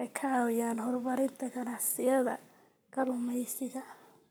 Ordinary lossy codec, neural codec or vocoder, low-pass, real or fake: none; codec, 44.1 kHz, 7.8 kbps, Pupu-Codec; none; fake